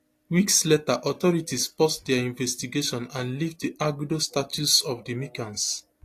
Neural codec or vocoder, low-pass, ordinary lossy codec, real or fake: none; 14.4 kHz; AAC, 48 kbps; real